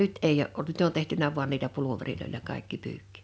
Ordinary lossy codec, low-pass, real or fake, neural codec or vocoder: none; none; real; none